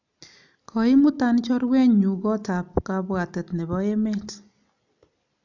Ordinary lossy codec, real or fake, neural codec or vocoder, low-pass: none; real; none; 7.2 kHz